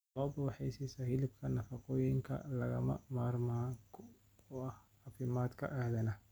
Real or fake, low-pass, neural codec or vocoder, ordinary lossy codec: real; none; none; none